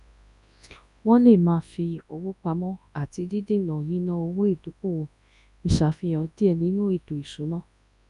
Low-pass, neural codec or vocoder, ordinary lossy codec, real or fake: 10.8 kHz; codec, 24 kHz, 0.9 kbps, WavTokenizer, large speech release; none; fake